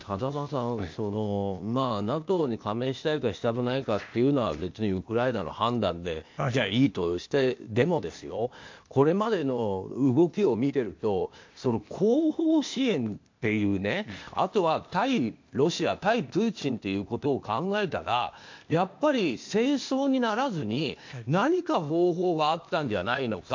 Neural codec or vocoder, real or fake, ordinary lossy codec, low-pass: codec, 16 kHz, 0.8 kbps, ZipCodec; fake; MP3, 48 kbps; 7.2 kHz